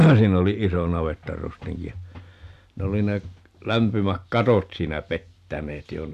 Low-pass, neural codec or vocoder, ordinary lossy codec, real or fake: 14.4 kHz; none; none; real